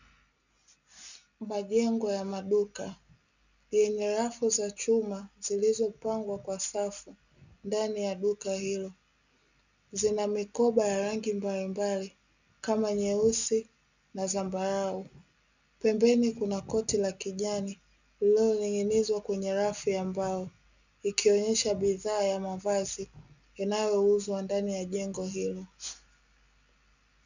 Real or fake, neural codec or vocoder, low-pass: real; none; 7.2 kHz